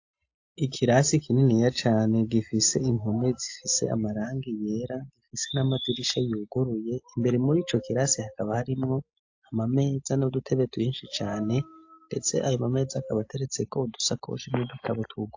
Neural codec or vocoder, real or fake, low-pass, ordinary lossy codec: none; real; 7.2 kHz; AAC, 48 kbps